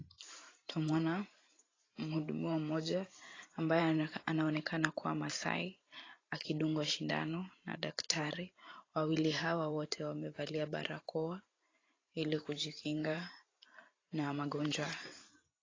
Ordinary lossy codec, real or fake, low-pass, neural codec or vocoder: AAC, 32 kbps; fake; 7.2 kHz; vocoder, 44.1 kHz, 128 mel bands every 512 samples, BigVGAN v2